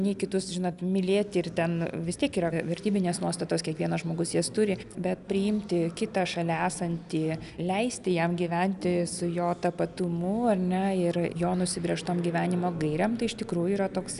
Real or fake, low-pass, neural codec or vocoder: real; 10.8 kHz; none